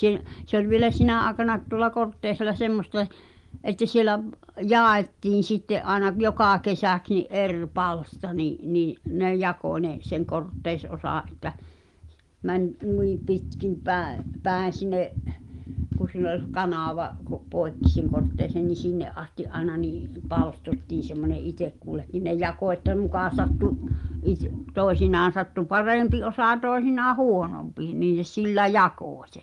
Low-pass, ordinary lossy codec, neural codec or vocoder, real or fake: 10.8 kHz; Opus, 24 kbps; none; real